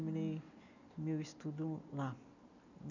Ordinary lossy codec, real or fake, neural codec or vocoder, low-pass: none; real; none; 7.2 kHz